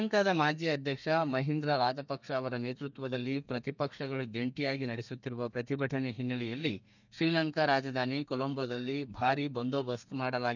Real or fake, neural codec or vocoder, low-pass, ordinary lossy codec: fake; codec, 32 kHz, 1.9 kbps, SNAC; 7.2 kHz; none